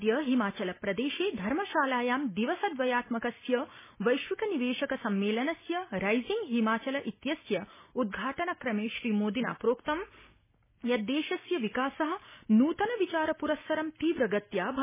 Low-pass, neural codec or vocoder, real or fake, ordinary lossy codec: 3.6 kHz; none; real; MP3, 16 kbps